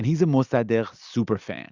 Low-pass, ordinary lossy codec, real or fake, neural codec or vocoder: 7.2 kHz; Opus, 64 kbps; real; none